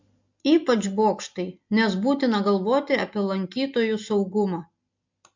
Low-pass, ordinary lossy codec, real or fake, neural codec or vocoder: 7.2 kHz; MP3, 48 kbps; real; none